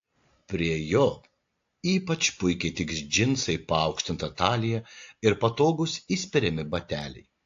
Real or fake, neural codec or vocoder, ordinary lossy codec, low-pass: real; none; AAC, 48 kbps; 7.2 kHz